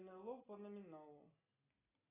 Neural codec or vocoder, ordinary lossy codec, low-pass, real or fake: none; AAC, 16 kbps; 3.6 kHz; real